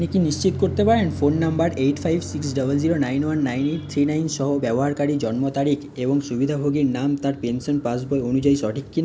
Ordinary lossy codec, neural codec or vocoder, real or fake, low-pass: none; none; real; none